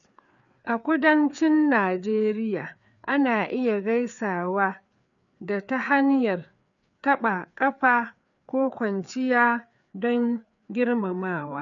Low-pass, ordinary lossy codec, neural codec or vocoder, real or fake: 7.2 kHz; none; codec, 16 kHz, 4 kbps, FreqCodec, larger model; fake